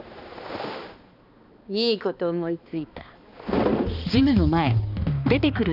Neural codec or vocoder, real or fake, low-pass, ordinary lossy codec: codec, 16 kHz, 2 kbps, X-Codec, HuBERT features, trained on balanced general audio; fake; 5.4 kHz; none